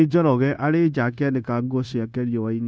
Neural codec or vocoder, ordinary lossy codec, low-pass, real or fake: codec, 16 kHz, 0.9 kbps, LongCat-Audio-Codec; none; none; fake